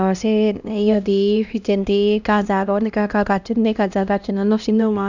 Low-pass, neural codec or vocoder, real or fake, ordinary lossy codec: 7.2 kHz; codec, 16 kHz, 1 kbps, X-Codec, HuBERT features, trained on LibriSpeech; fake; none